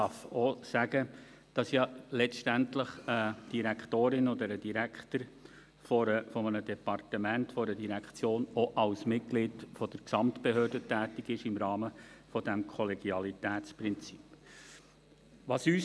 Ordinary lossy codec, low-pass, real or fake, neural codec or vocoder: none; none; real; none